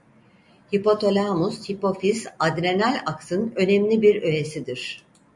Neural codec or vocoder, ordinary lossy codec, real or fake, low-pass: none; MP3, 64 kbps; real; 10.8 kHz